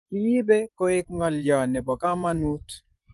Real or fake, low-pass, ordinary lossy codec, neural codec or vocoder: fake; 10.8 kHz; Opus, 32 kbps; vocoder, 24 kHz, 100 mel bands, Vocos